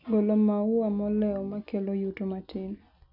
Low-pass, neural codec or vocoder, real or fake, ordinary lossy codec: 5.4 kHz; none; real; none